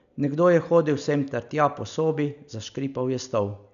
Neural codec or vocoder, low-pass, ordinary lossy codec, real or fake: none; 7.2 kHz; none; real